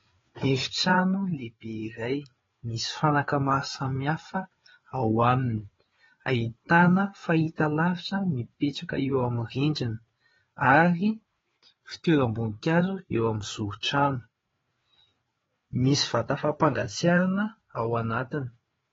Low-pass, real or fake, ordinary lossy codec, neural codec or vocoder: 7.2 kHz; fake; AAC, 24 kbps; codec, 16 kHz, 4 kbps, FreqCodec, larger model